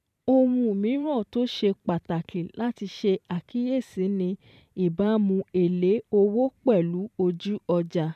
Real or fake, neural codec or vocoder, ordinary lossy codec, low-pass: fake; vocoder, 44.1 kHz, 128 mel bands every 512 samples, BigVGAN v2; none; 14.4 kHz